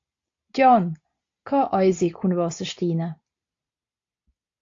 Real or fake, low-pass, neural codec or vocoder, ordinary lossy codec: real; 7.2 kHz; none; AAC, 48 kbps